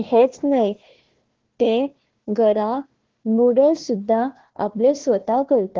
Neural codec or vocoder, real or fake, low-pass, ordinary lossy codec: codec, 16 kHz, 1 kbps, FunCodec, trained on Chinese and English, 50 frames a second; fake; 7.2 kHz; Opus, 16 kbps